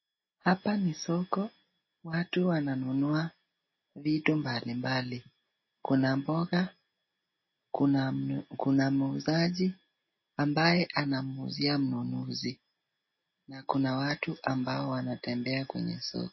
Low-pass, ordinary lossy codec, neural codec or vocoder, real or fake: 7.2 kHz; MP3, 24 kbps; none; real